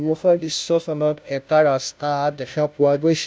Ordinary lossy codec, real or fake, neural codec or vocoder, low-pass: none; fake; codec, 16 kHz, 0.5 kbps, FunCodec, trained on Chinese and English, 25 frames a second; none